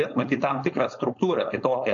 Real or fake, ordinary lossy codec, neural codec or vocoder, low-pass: fake; AAC, 64 kbps; codec, 16 kHz, 4.8 kbps, FACodec; 7.2 kHz